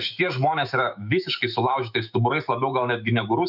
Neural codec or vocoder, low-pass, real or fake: autoencoder, 48 kHz, 128 numbers a frame, DAC-VAE, trained on Japanese speech; 5.4 kHz; fake